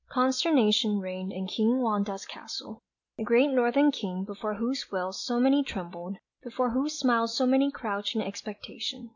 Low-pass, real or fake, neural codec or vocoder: 7.2 kHz; real; none